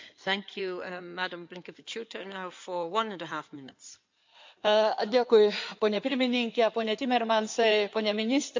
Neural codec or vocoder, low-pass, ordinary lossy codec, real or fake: codec, 16 kHz in and 24 kHz out, 2.2 kbps, FireRedTTS-2 codec; 7.2 kHz; MP3, 64 kbps; fake